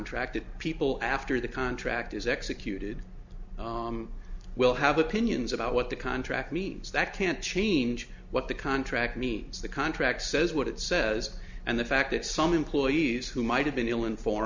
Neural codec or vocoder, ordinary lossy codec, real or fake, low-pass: none; MP3, 64 kbps; real; 7.2 kHz